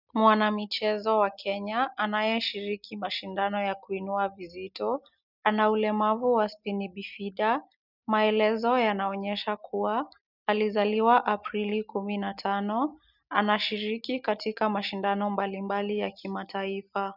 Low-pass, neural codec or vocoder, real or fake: 5.4 kHz; none; real